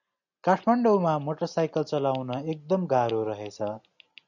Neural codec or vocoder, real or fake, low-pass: none; real; 7.2 kHz